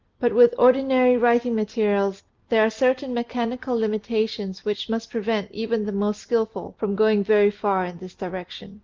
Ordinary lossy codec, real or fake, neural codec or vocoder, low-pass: Opus, 32 kbps; real; none; 7.2 kHz